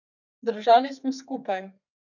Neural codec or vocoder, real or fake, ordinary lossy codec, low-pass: codec, 32 kHz, 1.9 kbps, SNAC; fake; none; 7.2 kHz